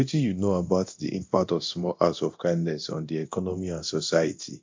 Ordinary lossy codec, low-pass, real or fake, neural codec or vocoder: MP3, 48 kbps; 7.2 kHz; fake; codec, 24 kHz, 0.9 kbps, DualCodec